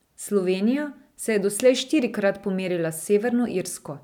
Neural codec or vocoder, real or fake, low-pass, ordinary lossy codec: none; real; 19.8 kHz; none